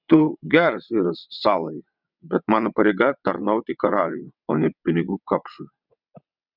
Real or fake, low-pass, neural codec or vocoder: fake; 5.4 kHz; vocoder, 22.05 kHz, 80 mel bands, WaveNeXt